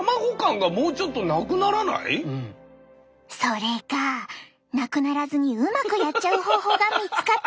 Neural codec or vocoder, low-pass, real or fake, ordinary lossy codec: none; none; real; none